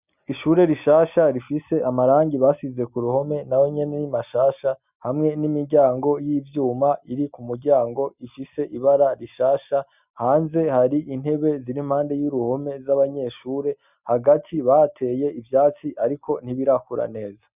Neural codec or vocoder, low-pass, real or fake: none; 3.6 kHz; real